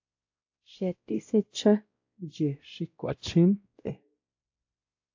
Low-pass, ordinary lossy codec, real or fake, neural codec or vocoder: 7.2 kHz; AAC, 48 kbps; fake; codec, 16 kHz, 0.5 kbps, X-Codec, WavLM features, trained on Multilingual LibriSpeech